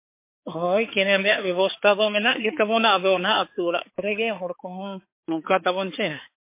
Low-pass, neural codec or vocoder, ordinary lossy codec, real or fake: 3.6 kHz; codec, 16 kHz, 4 kbps, X-Codec, HuBERT features, trained on balanced general audio; MP3, 24 kbps; fake